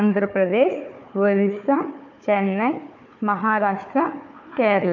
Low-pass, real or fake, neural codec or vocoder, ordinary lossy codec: 7.2 kHz; fake; codec, 16 kHz, 4 kbps, FunCodec, trained on Chinese and English, 50 frames a second; none